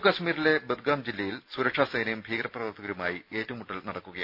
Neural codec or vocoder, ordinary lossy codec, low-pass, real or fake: none; none; 5.4 kHz; real